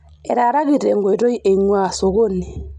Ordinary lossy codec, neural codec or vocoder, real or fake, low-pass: none; none; real; 10.8 kHz